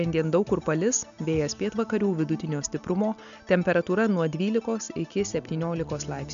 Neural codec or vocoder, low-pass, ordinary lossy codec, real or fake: none; 7.2 kHz; MP3, 96 kbps; real